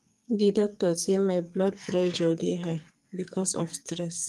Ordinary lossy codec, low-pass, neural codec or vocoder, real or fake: Opus, 32 kbps; 14.4 kHz; codec, 44.1 kHz, 2.6 kbps, SNAC; fake